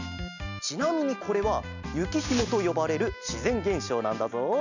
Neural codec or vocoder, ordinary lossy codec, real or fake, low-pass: none; none; real; 7.2 kHz